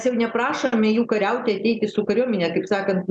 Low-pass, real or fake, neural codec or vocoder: 10.8 kHz; real; none